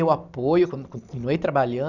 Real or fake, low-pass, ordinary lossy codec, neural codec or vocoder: fake; 7.2 kHz; none; vocoder, 44.1 kHz, 128 mel bands every 256 samples, BigVGAN v2